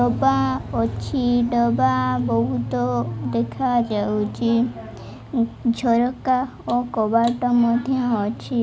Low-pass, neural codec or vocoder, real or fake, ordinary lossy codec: none; none; real; none